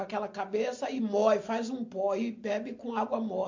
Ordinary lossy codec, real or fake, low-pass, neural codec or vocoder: MP3, 48 kbps; real; 7.2 kHz; none